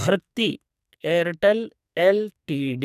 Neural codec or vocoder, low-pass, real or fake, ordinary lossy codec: codec, 44.1 kHz, 2.6 kbps, SNAC; 14.4 kHz; fake; none